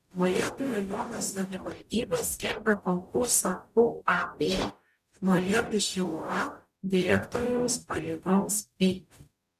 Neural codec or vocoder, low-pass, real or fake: codec, 44.1 kHz, 0.9 kbps, DAC; 14.4 kHz; fake